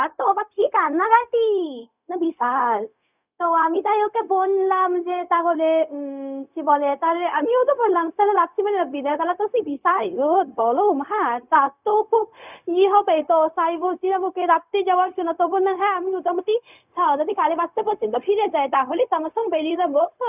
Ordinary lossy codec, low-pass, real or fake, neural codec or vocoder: none; 3.6 kHz; fake; codec, 16 kHz, 0.4 kbps, LongCat-Audio-Codec